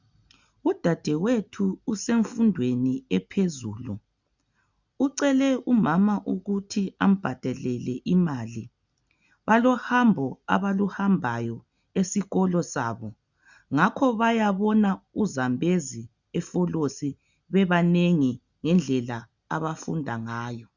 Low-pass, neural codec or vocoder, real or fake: 7.2 kHz; none; real